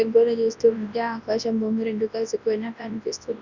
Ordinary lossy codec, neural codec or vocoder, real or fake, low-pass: Opus, 64 kbps; codec, 24 kHz, 0.9 kbps, WavTokenizer, large speech release; fake; 7.2 kHz